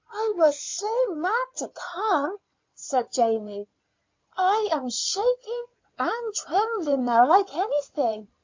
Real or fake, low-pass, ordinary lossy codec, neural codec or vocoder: fake; 7.2 kHz; MP3, 48 kbps; codec, 16 kHz in and 24 kHz out, 2.2 kbps, FireRedTTS-2 codec